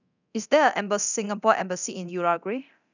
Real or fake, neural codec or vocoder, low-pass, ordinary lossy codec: fake; codec, 24 kHz, 0.9 kbps, DualCodec; 7.2 kHz; none